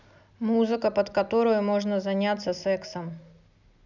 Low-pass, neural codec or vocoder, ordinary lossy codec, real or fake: 7.2 kHz; none; none; real